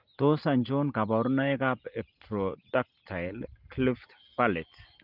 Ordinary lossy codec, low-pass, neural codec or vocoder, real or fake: Opus, 32 kbps; 5.4 kHz; none; real